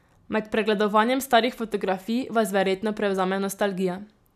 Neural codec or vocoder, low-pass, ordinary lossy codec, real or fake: none; 14.4 kHz; none; real